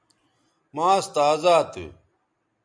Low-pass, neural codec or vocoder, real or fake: 9.9 kHz; none; real